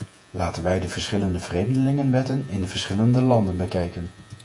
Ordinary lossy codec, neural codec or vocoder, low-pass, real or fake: AAC, 64 kbps; vocoder, 48 kHz, 128 mel bands, Vocos; 10.8 kHz; fake